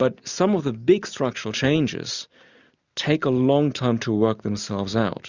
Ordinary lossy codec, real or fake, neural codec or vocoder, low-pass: Opus, 64 kbps; real; none; 7.2 kHz